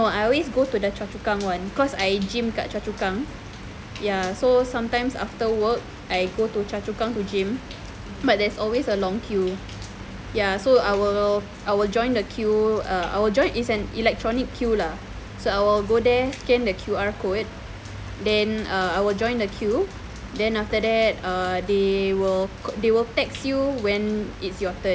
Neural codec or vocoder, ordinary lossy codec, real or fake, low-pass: none; none; real; none